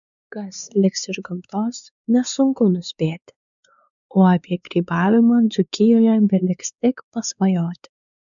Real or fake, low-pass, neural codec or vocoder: fake; 7.2 kHz; codec, 16 kHz, 4 kbps, X-Codec, WavLM features, trained on Multilingual LibriSpeech